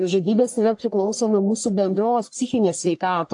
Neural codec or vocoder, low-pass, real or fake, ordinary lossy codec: codec, 44.1 kHz, 1.7 kbps, Pupu-Codec; 10.8 kHz; fake; AAC, 64 kbps